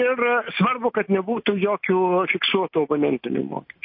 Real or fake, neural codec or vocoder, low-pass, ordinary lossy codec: real; none; 5.4 kHz; MP3, 32 kbps